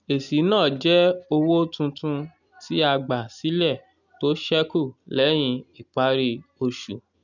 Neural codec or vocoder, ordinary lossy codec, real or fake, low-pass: none; none; real; 7.2 kHz